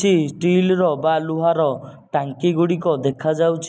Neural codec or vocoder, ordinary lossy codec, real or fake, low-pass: none; none; real; none